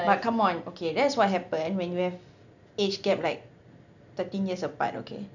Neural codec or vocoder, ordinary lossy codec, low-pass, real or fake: none; none; 7.2 kHz; real